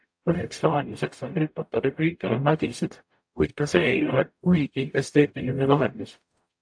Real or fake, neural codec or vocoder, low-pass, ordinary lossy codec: fake; codec, 44.1 kHz, 0.9 kbps, DAC; 9.9 kHz; AAC, 64 kbps